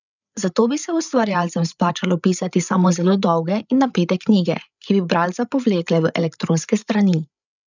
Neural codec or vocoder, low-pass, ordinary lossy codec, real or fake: codec, 16 kHz, 8 kbps, FreqCodec, larger model; 7.2 kHz; none; fake